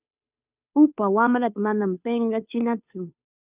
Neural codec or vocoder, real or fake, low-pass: codec, 16 kHz, 2 kbps, FunCodec, trained on Chinese and English, 25 frames a second; fake; 3.6 kHz